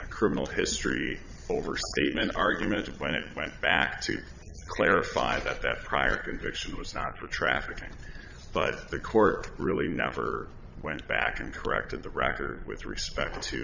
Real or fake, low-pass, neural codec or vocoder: fake; 7.2 kHz; vocoder, 22.05 kHz, 80 mel bands, Vocos